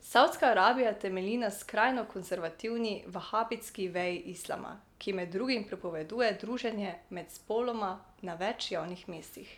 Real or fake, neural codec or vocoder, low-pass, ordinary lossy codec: real; none; 19.8 kHz; none